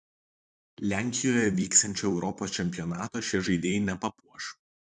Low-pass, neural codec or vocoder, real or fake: 10.8 kHz; vocoder, 44.1 kHz, 128 mel bands, Pupu-Vocoder; fake